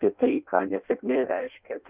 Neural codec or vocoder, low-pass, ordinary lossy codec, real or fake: codec, 16 kHz in and 24 kHz out, 0.6 kbps, FireRedTTS-2 codec; 3.6 kHz; Opus, 32 kbps; fake